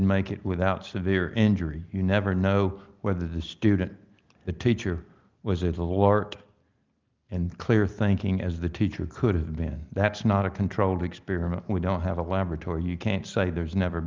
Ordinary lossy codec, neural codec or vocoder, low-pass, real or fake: Opus, 32 kbps; none; 7.2 kHz; real